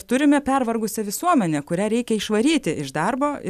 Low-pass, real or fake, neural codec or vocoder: 14.4 kHz; real; none